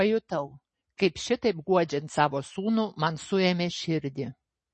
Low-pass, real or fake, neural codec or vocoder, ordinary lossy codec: 10.8 kHz; real; none; MP3, 32 kbps